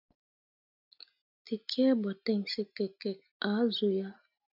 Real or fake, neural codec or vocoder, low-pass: real; none; 5.4 kHz